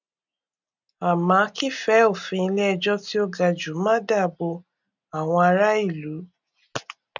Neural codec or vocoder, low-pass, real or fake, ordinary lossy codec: none; 7.2 kHz; real; none